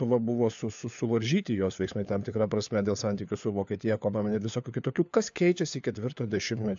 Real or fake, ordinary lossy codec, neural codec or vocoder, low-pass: fake; AAC, 64 kbps; codec, 16 kHz, 4 kbps, FunCodec, trained on LibriTTS, 50 frames a second; 7.2 kHz